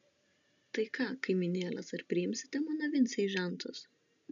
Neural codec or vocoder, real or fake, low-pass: none; real; 7.2 kHz